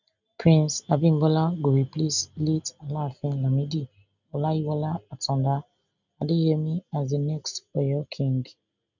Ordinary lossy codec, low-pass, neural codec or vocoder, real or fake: none; 7.2 kHz; none; real